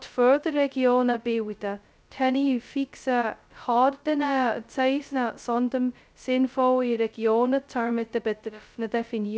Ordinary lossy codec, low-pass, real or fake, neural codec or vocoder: none; none; fake; codec, 16 kHz, 0.2 kbps, FocalCodec